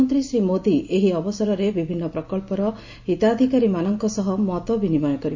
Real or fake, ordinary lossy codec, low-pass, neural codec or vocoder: real; MP3, 48 kbps; 7.2 kHz; none